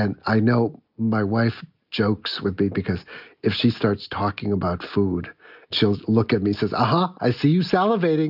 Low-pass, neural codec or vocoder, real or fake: 5.4 kHz; none; real